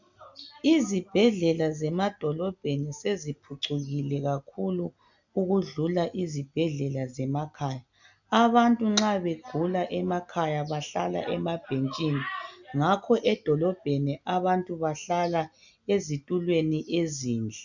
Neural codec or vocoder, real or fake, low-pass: none; real; 7.2 kHz